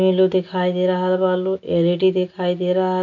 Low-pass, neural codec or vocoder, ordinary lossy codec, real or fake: 7.2 kHz; none; none; real